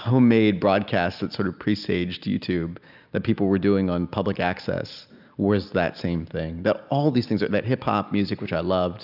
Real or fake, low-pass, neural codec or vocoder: real; 5.4 kHz; none